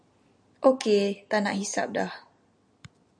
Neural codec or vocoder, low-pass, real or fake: none; 9.9 kHz; real